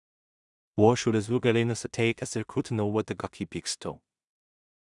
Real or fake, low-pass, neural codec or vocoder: fake; 10.8 kHz; codec, 16 kHz in and 24 kHz out, 0.4 kbps, LongCat-Audio-Codec, two codebook decoder